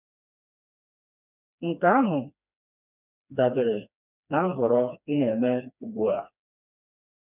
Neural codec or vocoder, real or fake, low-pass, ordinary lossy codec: codec, 16 kHz, 2 kbps, FreqCodec, smaller model; fake; 3.6 kHz; none